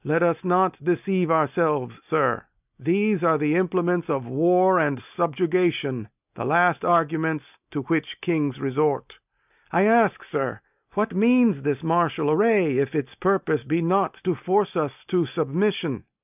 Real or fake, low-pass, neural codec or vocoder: real; 3.6 kHz; none